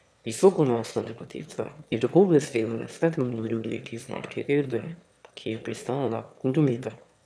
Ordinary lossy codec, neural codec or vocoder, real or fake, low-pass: none; autoencoder, 22.05 kHz, a latent of 192 numbers a frame, VITS, trained on one speaker; fake; none